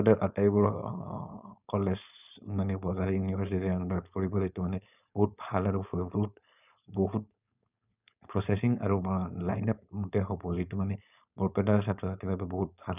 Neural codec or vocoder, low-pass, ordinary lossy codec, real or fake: codec, 16 kHz, 4.8 kbps, FACodec; 3.6 kHz; none; fake